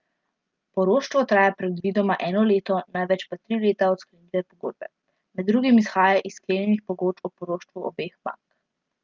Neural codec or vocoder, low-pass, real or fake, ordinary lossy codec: none; 7.2 kHz; real; Opus, 24 kbps